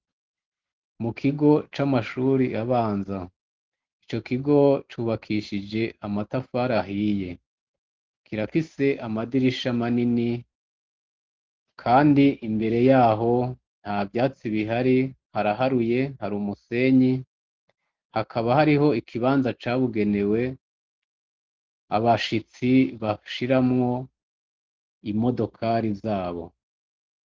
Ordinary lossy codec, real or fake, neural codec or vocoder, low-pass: Opus, 16 kbps; real; none; 7.2 kHz